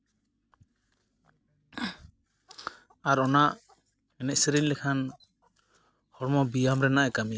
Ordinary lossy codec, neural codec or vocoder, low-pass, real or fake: none; none; none; real